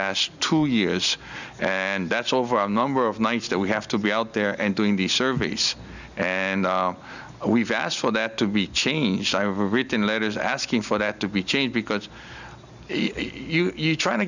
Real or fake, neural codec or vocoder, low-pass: real; none; 7.2 kHz